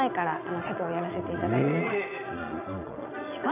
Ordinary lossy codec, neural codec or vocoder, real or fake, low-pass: none; vocoder, 22.05 kHz, 80 mel bands, Vocos; fake; 3.6 kHz